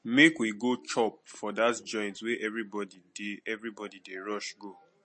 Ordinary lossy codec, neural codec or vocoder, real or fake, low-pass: MP3, 32 kbps; none; real; 10.8 kHz